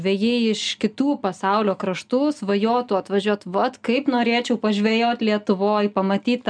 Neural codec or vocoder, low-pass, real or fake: none; 9.9 kHz; real